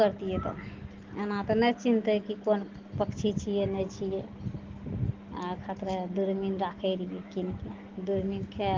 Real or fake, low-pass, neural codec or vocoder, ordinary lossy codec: real; 7.2 kHz; none; Opus, 16 kbps